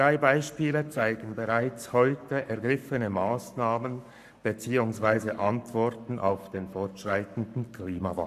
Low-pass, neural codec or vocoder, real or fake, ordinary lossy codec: 14.4 kHz; codec, 44.1 kHz, 7.8 kbps, Pupu-Codec; fake; none